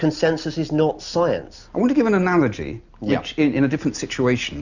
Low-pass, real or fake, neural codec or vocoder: 7.2 kHz; real; none